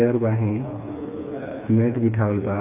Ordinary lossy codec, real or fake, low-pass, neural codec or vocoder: AAC, 32 kbps; fake; 3.6 kHz; codec, 16 kHz, 4 kbps, FreqCodec, smaller model